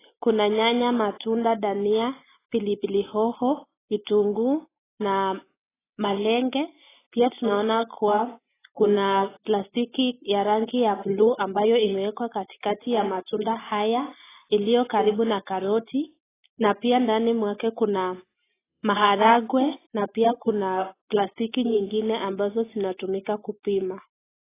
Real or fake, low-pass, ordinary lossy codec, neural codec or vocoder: real; 3.6 kHz; AAC, 16 kbps; none